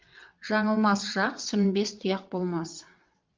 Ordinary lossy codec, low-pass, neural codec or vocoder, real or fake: Opus, 32 kbps; 7.2 kHz; vocoder, 22.05 kHz, 80 mel bands, WaveNeXt; fake